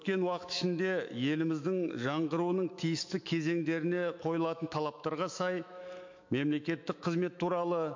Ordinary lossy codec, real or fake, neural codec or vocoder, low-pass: MP3, 48 kbps; fake; autoencoder, 48 kHz, 128 numbers a frame, DAC-VAE, trained on Japanese speech; 7.2 kHz